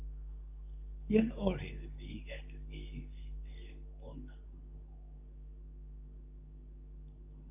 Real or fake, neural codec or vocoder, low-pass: fake; codec, 24 kHz, 0.9 kbps, WavTokenizer, medium speech release version 2; 3.6 kHz